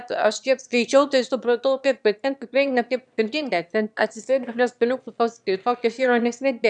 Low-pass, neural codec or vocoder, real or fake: 9.9 kHz; autoencoder, 22.05 kHz, a latent of 192 numbers a frame, VITS, trained on one speaker; fake